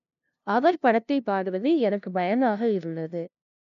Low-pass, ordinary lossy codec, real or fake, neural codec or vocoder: 7.2 kHz; none; fake; codec, 16 kHz, 0.5 kbps, FunCodec, trained on LibriTTS, 25 frames a second